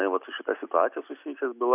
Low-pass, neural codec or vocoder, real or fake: 3.6 kHz; none; real